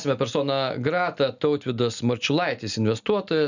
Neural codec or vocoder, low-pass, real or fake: none; 7.2 kHz; real